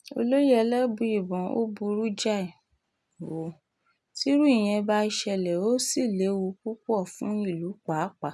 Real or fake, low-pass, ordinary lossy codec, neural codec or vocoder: real; none; none; none